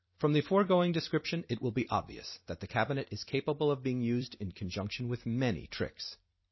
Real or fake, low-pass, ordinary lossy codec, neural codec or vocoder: fake; 7.2 kHz; MP3, 24 kbps; vocoder, 44.1 kHz, 128 mel bands every 256 samples, BigVGAN v2